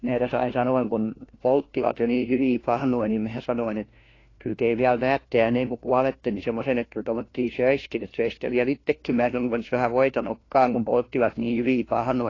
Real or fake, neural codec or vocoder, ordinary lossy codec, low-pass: fake; codec, 16 kHz, 1 kbps, FunCodec, trained on LibriTTS, 50 frames a second; AAC, 32 kbps; 7.2 kHz